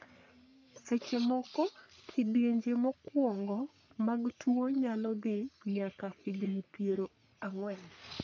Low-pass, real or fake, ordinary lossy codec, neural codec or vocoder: 7.2 kHz; fake; MP3, 64 kbps; codec, 44.1 kHz, 3.4 kbps, Pupu-Codec